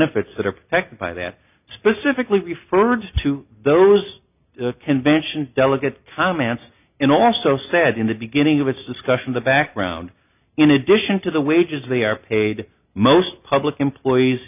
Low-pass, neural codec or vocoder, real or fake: 3.6 kHz; none; real